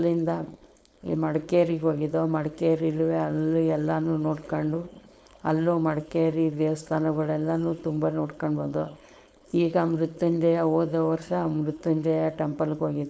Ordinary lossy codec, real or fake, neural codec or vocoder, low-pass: none; fake; codec, 16 kHz, 4.8 kbps, FACodec; none